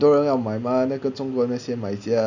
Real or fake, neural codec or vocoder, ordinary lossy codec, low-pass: real; none; none; 7.2 kHz